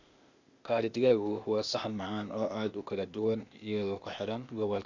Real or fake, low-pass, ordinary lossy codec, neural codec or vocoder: fake; 7.2 kHz; Opus, 64 kbps; codec, 16 kHz, 0.8 kbps, ZipCodec